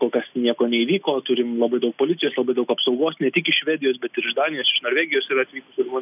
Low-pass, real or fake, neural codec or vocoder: 3.6 kHz; real; none